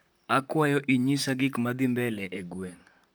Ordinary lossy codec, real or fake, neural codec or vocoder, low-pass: none; fake; vocoder, 44.1 kHz, 128 mel bands, Pupu-Vocoder; none